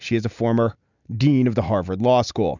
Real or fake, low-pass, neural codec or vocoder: real; 7.2 kHz; none